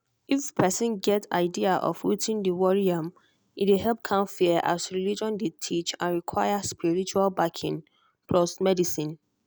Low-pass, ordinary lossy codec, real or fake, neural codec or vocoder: none; none; real; none